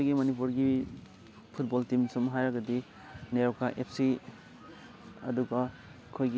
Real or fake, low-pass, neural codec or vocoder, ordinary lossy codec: real; none; none; none